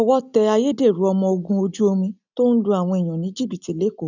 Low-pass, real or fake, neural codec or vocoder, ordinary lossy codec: 7.2 kHz; real; none; none